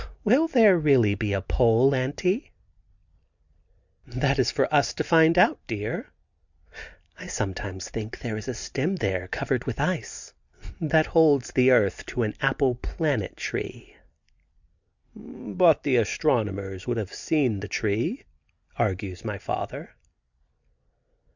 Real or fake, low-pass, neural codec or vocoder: real; 7.2 kHz; none